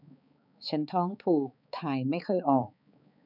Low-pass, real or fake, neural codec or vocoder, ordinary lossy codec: 5.4 kHz; fake; codec, 16 kHz, 4 kbps, X-Codec, HuBERT features, trained on balanced general audio; none